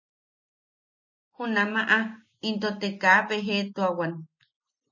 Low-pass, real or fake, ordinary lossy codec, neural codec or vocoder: 7.2 kHz; real; MP3, 32 kbps; none